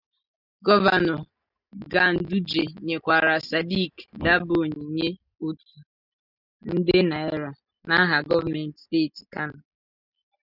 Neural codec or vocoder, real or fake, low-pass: none; real; 5.4 kHz